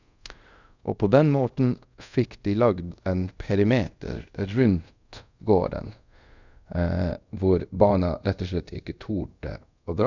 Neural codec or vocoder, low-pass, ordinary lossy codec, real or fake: codec, 24 kHz, 0.5 kbps, DualCodec; 7.2 kHz; none; fake